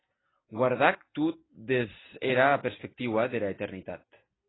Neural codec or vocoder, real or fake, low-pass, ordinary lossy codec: none; real; 7.2 kHz; AAC, 16 kbps